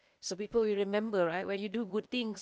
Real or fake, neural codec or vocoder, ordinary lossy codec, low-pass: fake; codec, 16 kHz, 0.8 kbps, ZipCodec; none; none